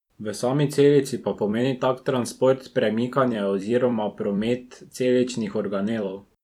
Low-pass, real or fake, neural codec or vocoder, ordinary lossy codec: 19.8 kHz; fake; vocoder, 44.1 kHz, 128 mel bands every 256 samples, BigVGAN v2; none